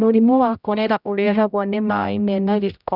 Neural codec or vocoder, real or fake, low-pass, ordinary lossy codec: codec, 16 kHz, 0.5 kbps, X-Codec, HuBERT features, trained on general audio; fake; 5.4 kHz; none